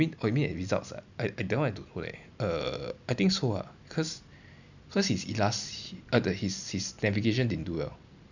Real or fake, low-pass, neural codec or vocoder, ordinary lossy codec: real; 7.2 kHz; none; none